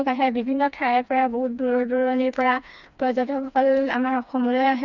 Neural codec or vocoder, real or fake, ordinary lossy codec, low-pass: codec, 16 kHz, 2 kbps, FreqCodec, smaller model; fake; none; 7.2 kHz